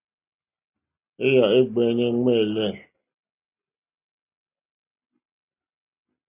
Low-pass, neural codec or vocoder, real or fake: 3.6 kHz; none; real